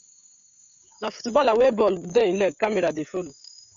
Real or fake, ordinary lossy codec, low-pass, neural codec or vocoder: fake; MP3, 64 kbps; 7.2 kHz; codec, 16 kHz, 16 kbps, FreqCodec, smaller model